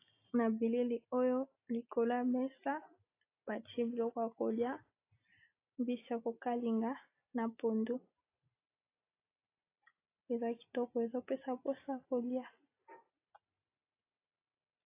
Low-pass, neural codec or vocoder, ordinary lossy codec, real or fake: 3.6 kHz; none; AAC, 24 kbps; real